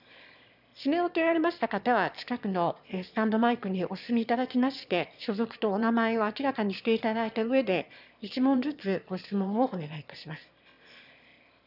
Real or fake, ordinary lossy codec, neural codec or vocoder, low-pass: fake; none; autoencoder, 22.05 kHz, a latent of 192 numbers a frame, VITS, trained on one speaker; 5.4 kHz